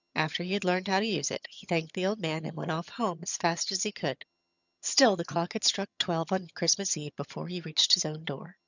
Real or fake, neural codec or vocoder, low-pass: fake; vocoder, 22.05 kHz, 80 mel bands, HiFi-GAN; 7.2 kHz